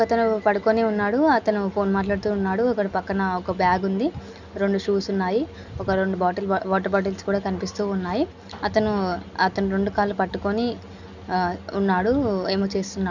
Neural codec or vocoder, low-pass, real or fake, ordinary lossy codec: none; 7.2 kHz; real; none